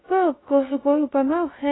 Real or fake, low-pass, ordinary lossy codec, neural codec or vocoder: fake; 7.2 kHz; AAC, 16 kbps; codec, 16 kHz, 0.2 kbps, FocalCodec